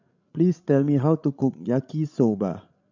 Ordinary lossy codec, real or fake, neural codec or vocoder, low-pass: none; fake; codec, 16 kHz, 16 kbps, FreqCodec, larger model; 7.2 kHz